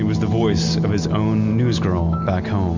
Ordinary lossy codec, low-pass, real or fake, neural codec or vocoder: MP3, 64 kbps; 7.2 kHz; real; none